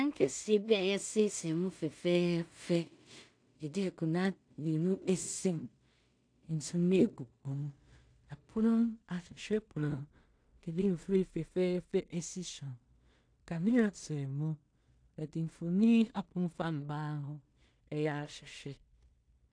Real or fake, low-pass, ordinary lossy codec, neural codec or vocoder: fake; 9.9 kHz; AAC, 64 kbps; codec, 16 kHz in and 24 kHz out, 0.4 kbps, LongCat-Audio-Codec, two codebook decoder